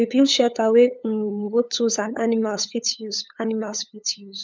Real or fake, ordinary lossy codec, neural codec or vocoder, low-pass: fake; none; codec, 16 kHz, 8 kbps, FunCodec, trained on LibriTTS, 25 frames a second; none